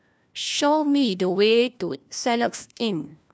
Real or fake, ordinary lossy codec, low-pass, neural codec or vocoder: fake; none; none; codec, 16 kHz, 1 kbps, FunCodec, trained on LibriTTS, 50 frames a second